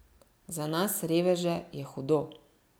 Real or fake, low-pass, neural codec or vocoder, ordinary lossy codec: real; none; none; none